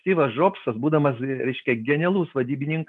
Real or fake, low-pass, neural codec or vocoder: real; 10.8 kHz; none